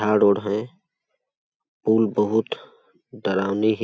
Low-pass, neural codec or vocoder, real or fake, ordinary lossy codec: none; none; real; none